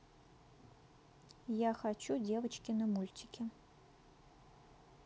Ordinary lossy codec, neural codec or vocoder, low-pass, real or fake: none; none; none; real